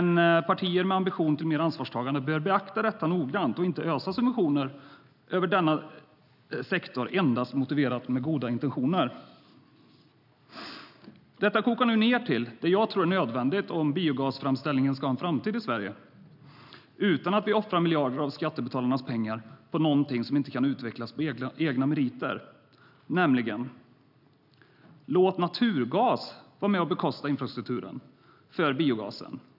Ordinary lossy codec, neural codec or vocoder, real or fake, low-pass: none; none; real; 5.4 kHz